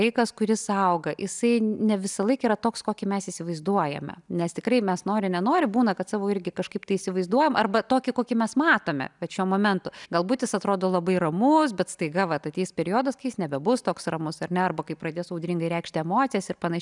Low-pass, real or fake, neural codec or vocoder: 10.8 kHz; real; none